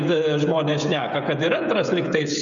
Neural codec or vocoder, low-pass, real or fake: codec, 16 kHz, 16 kbps, FreqCodec, smaller model; 7.2 kHz; fake